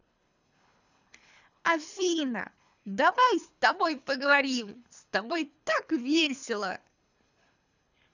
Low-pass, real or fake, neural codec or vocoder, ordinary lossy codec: 7.2 kHz; fake; codec, 24 kHz, 3 kbps, HILCodec; none